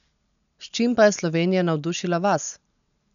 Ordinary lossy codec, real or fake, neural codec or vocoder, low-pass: none; real; none; 7.2 kHz